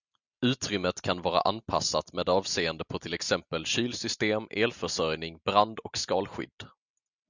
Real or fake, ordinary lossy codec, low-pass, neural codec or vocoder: real; Opus, 64 kbps; 7.2 kHz; none